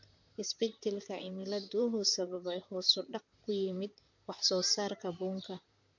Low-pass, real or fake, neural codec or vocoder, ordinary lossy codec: 7.2 kHz; fake; vocoder, 44.1 kHz, 128 mel bands, Pupu-Vocoder; MP3, 48 kbps